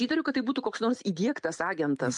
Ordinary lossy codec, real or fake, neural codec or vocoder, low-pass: MP3, 64 kbps; real; none; 10.8 kHz